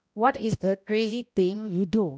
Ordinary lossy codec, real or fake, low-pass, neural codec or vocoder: none; fake; none; codec, 16 kHz, 0.5 kbps, X-Codec, HuBERT features, trained on balanced general audio